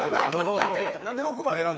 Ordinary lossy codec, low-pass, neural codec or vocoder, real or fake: none; none; codec, 16 kHz, 2 kbps, FreqCodec, larger model; fake